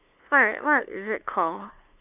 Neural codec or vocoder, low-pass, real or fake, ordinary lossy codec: codec, 16 kHz, 2 kbps, FunCodec, trained on LibriTTS, 25 frames a second; 3.6 kHz; fake; none